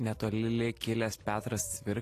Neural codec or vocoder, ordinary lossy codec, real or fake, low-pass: none; AAC, 48 kbps; real; 14.4 kHz